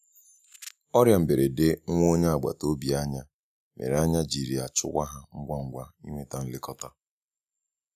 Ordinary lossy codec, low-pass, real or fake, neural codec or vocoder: none; 14.4 kHz; real; none